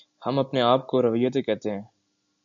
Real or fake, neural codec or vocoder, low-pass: real; none; 7.2 kHz